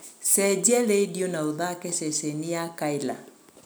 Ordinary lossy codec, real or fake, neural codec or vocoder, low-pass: none; real; none; none